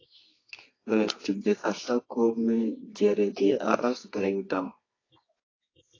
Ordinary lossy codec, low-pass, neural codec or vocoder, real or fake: AAC, 32 kbps; 7.2 kHz; codec, 24 kHz, 0.9 kbps, WavTokenizer, medium music audio release; fake